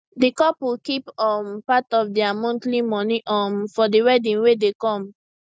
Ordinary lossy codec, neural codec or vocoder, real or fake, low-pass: none; none; real; none